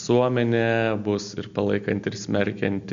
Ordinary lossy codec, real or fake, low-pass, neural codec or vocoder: MP3, 64 kbps; real; 7.2 kHz; none